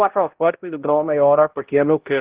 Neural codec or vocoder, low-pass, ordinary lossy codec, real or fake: codec, 16 kHz, 0.5 kbps, X-Codec, HuBERT features, trained on balanced general audio; 3.6 kHz; Opus, 16 kbps; fake